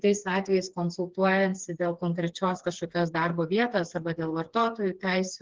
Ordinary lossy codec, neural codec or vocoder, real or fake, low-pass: Opus, 16 kbps; codec, 16 kHz, 4 kbps, FreqCodec, smaller model; fake; 7.2 kHz